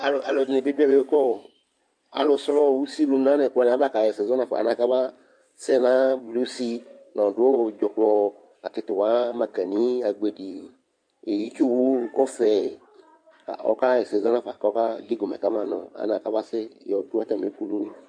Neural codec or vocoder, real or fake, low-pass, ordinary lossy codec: codec, 16 kHz in and 24 kHz out, 2.2 kbps, FireRedTTS-2 codec; fake; 9.9 kHz; MP3, 64 kbps